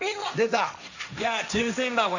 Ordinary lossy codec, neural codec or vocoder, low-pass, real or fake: none; codec, 16 kHz, 1.1 kbps, Voila-Tokenizer; 7.2 kHz; fake